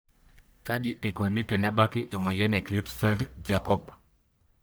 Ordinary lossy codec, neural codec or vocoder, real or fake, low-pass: none; codec, 44.1 kHz, 1.7 kbps, Pupu-Codec; fake; none